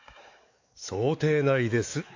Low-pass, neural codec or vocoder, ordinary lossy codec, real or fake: 7.2 kHz; codec, 16 kHz, 4 kbps, X-Codec, WavLM features, trained on Multilingual LibriSpeech; AAC, 48 kbps; fake